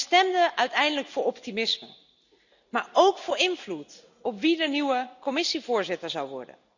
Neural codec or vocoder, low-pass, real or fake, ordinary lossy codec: none; 7.2 kHz; real; none